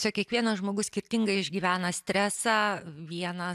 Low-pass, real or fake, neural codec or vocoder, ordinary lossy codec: 14.4 kHz; real; none; AAC, 96 kbps